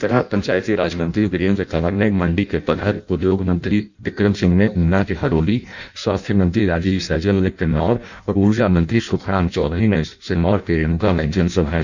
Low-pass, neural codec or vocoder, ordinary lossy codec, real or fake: 7.2 kHz; codec, 16 kHz in and 24 kHz out, 0.6 kbps, FireRedTTS-2 codec; none; fake